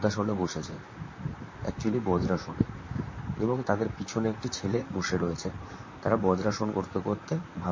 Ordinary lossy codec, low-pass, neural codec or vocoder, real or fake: MP3, 32 kbps; 7.2 kHz; vocoder, 44.1 kHz, 128 mel bands, Pupu-Vocoder; fake